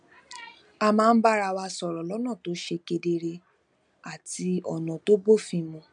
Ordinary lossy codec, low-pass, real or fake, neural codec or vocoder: none; 9.9 kHz; real; none